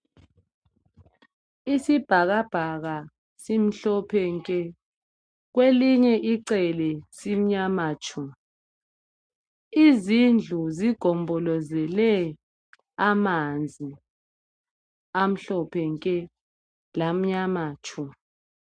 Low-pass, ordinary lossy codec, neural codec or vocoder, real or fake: 9.9 kHz; MP3, 64 kbps; none; real